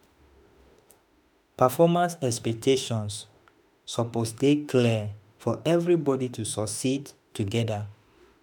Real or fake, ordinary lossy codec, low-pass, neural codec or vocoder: fake; none; none; autoencoder, 48 kHz, 32 numbers a frame, DAC-VAE, trained on Japanese speech